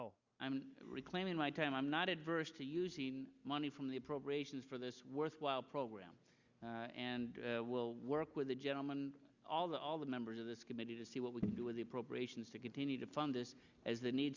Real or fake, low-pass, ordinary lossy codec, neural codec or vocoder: real; 7.2 kHz; Opus, 64 kbps; none